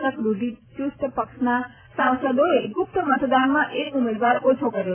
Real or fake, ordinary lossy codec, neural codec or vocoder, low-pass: real; AAC, 24 kbps; none; 3.6 kHz